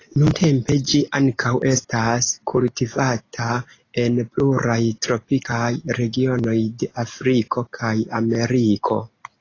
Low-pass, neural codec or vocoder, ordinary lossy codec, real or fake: 7.2 kHz; none; AAC, 32 kbps; real